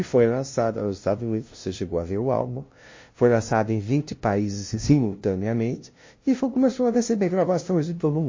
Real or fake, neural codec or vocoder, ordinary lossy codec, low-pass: fake; codec, 16 kHz, 0.5 kbps, FunCodec, trained on LibriTTS, 25 frames a second; MP3, 32 kbps; 7.2 kHz